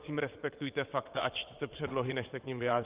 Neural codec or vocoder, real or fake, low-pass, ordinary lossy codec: vocoder, 22.05 kHz, 80 mel bands, WaveNeXt; fake; 3.6 kHz; Opus, 24 kbps